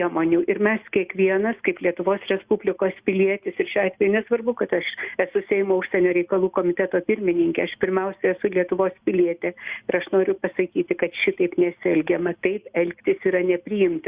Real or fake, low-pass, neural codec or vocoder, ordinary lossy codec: real; 3.6 kHz; none; Opus, 64 kbps